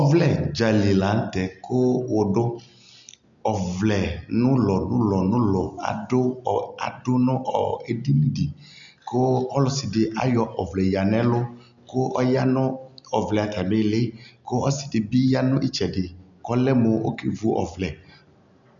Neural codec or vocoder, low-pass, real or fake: none; 7.2 kHz; real